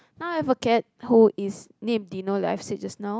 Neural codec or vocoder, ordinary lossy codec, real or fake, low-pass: none; none; real; none